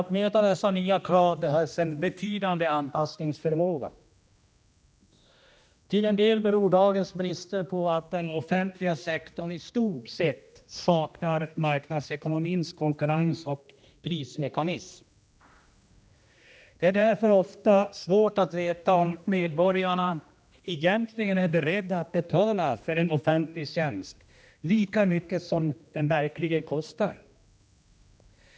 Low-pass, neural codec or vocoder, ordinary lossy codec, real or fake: none; codec, 16 kHz, 1 kbps, X-Codec, HuBERT features, trained on general audio; none; fake